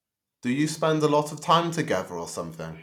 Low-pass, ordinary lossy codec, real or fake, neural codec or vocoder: 19.8 kHz; none; real; none